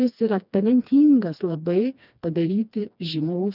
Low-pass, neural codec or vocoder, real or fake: 5.4 kHz; codec, 16 kHz, 2 kbps, FreqCodec, smaller model; fake